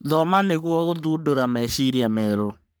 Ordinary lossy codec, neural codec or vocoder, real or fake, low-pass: none; codec, 44.1 kHz, 3.4 kbps, Pupu-Codec; fake; none